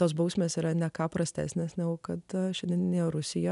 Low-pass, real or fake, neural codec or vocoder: 10.8 kHz; real; none